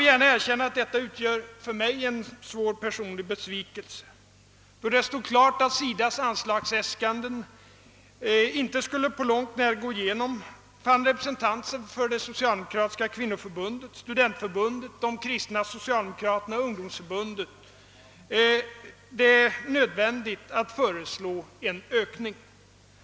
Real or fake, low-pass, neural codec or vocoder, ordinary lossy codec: real; none; none; none